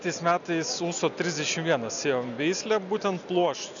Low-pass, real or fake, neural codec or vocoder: 7.2 kHz; real; none